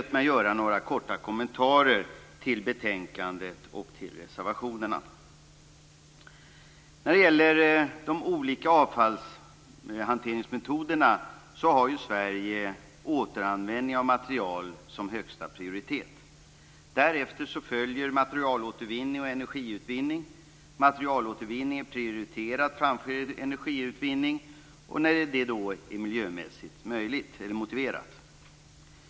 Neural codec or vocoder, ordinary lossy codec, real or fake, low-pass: none; none; real; none